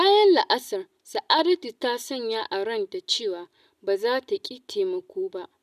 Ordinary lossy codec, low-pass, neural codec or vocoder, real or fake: none; 14.4 kHz; none; real